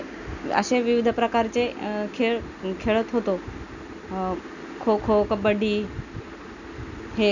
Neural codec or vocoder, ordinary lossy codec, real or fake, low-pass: none; none; real; 7.2 kHz